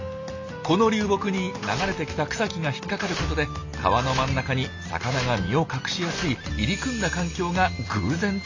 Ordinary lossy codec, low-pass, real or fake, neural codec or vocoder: AAC, 48 kbps; 7.2 kHz; real; none